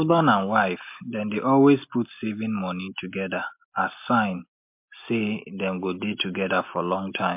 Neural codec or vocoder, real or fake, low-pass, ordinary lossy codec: none; real; 3.6 kHz; MP3, 32 kbps